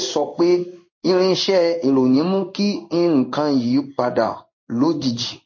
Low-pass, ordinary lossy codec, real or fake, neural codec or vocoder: 7.2 kHz; MP3, 32 kbps; fake; codec, 16 kHz in and 24 kHz out, 1 kbps, XY-Tokenizer